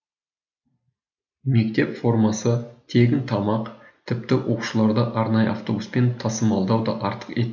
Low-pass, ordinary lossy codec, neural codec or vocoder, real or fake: 7.2 kHz; none; none; real